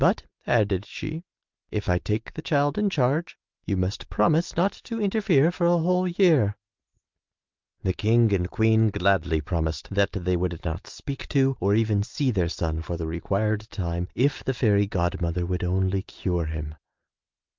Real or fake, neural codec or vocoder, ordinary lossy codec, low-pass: real; none; Opus, 24 kbps; 7.2 kHz